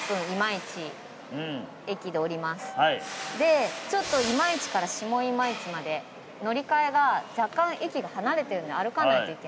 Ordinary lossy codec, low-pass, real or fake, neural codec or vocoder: none; none; real; none